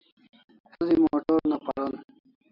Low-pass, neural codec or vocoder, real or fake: 5.4 kHz; none; real